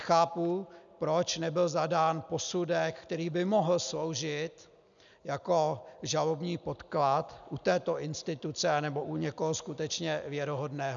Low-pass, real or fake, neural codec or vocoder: 7.2 kHz; real; none